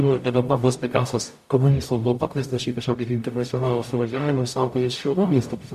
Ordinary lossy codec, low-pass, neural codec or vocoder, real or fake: MP3, 64 kbps; 14.4 kHz; codec, 44.1 kHz, 0.9 kbps, DAC; fake